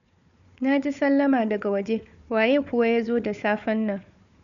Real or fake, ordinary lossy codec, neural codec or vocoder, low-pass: fake; none; codec, 16 kHz, 4 kbps, FunCodec, trained on Chinese and English, 50 frames a second; 7.2 kHz